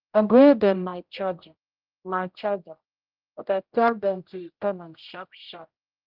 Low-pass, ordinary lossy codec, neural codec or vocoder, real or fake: 5.4 kHz; Opus, 24 kbps; codec, 16 kHz, 0.5 kbps, X-Codec, HuBERT features, trained on general audio; fake